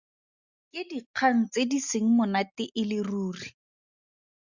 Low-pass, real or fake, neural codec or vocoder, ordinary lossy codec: 7.2 kHz; real; none; Opus, 64 kbps